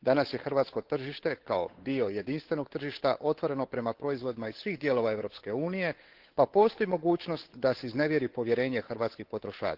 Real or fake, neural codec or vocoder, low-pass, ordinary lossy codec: fake; codec, 16 kHz, 8 kbps, FunCodec, trained on Chinese and English, 25 frames a second; 5.4 kHz; Opus, 16 kbps